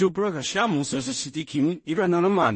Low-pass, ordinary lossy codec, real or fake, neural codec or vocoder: 10.8 kHz; MP3, 32 kbps; fake; codec, 16 kHz in and 24 kHz out, 0.4 kbps, LongCat-Audio-Codec, two codebook decoder